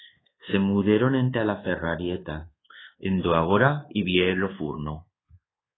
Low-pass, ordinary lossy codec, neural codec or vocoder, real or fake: 7.2 kHz; AAC, 16 kbps; codec, 24 kHz, 1.2 kbps, DualCodec; fake